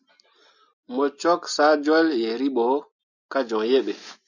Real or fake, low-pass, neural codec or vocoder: real; 7.2 kHz; none